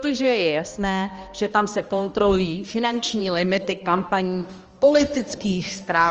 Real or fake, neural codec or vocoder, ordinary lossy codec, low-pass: fake; codec, 16 kHz, 1 kbps, X-Codec, HuBERT features, trained on balanced general audio; Opus, 24 kbps; 7.2 kHz